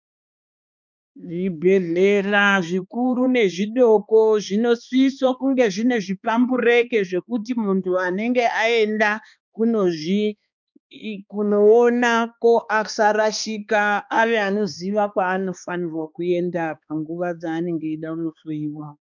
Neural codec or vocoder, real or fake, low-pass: codec, 16 kHz, 2 kbps, X-Codec, HuBERT features, trained on balanced general audio; fake; 7.2 kHz